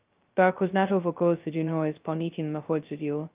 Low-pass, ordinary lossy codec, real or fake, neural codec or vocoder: 3.6 kHz; Opus, 24 kbps; fake; codec, 16 kHz, 0.2 kbps, FocalCodec